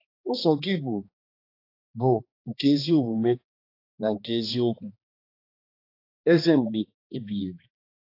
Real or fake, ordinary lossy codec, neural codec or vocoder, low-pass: fake; AAC, 32 kbps; codec, 16 kHz, 2 kbps, X-Codec, HuBERT features, trained on balanced general audio; 5.4 kHz